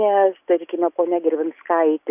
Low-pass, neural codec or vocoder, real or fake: 3.6 kHz; none; real